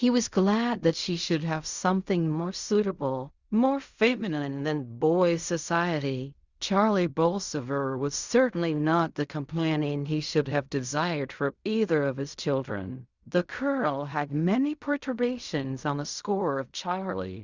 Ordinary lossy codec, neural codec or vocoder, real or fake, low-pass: Opus, 64 kbps; codec, 16 kHz in and 24 kHz out, 0.4 kbps, LongCat-Audio-Codec, fine tuned four codebook decoder; fake; 7.2 kHz